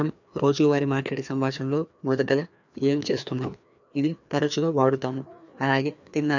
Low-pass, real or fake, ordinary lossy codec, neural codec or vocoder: 7.2 kHz; fake; none; codec, 16 kHz, 2 kbps, FreqCodec, larger model